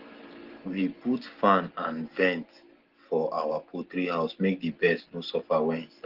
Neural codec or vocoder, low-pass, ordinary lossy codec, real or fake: none; 5.4 kHz; Opus, 16 kbps; real